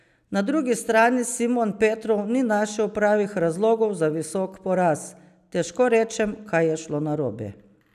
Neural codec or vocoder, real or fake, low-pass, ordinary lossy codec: none; real; 14.4 kHz; none